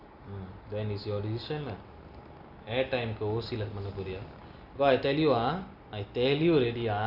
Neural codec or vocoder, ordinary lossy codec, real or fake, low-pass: none; none; real; 5.4 kHz